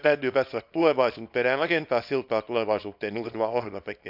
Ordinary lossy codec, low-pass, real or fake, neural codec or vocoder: none; 5.4 kHz; fake; codec, 24 kHz, 0.9 kbps, WavTokenizer, small release